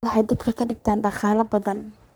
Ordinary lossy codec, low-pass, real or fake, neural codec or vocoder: none; none; fake; codec, 44.1 kHz, 3.4 kbps, Pupu-Codec